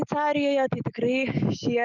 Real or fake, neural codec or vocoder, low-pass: real; none; 7.2 kHz